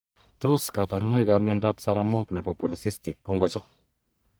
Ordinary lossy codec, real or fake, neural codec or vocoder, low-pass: none; fake; codec, 44.1 kHz, 1.7 kbps, Pupu-Codec; none